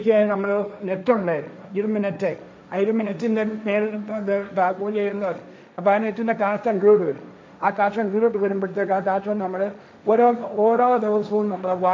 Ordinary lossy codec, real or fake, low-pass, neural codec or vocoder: none; fake; none; codec, 16 kHz, 1.1 kbps, Voila-Tokenizer